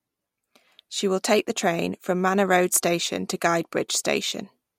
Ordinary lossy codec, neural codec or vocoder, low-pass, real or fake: MP3, 64 kbps; none; 19.8 kHz; real